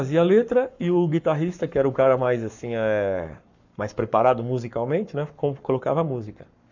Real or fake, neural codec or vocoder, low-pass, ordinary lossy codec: fake; codec, 44.1 kHz, 7.8 kbps, Pupu-Codec; 7.2 kHz; none